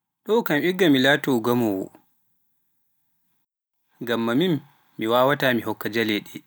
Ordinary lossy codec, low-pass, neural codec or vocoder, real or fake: none; none; none; real